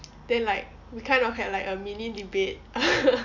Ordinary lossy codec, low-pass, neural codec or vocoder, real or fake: none; 7.2 kHz; none; real